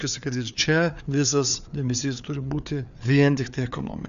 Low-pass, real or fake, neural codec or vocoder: 7.2 kHz; fake; codec, 16 kHz, 4 kbps, FreqCodec, larger model